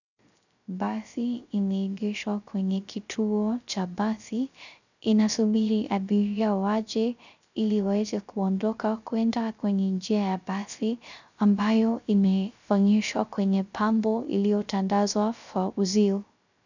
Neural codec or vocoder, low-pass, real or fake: codec, 16 kHz, 0.3 kbps, FocalCodec; 7.2 kHz; fake